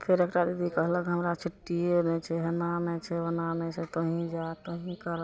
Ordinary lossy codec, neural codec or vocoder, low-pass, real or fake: none; none; none; real